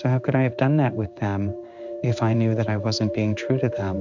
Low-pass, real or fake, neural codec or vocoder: 7.2 kHz; fake; codec, 16 kHz in and 24 kHz out, 1 kbps, XY-Tokenizer